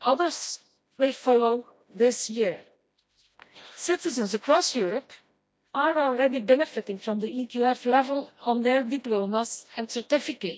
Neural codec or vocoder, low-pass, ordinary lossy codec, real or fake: codec, 16 kHz, 1 kbps, FreqCodec, smaller model; none; none; fake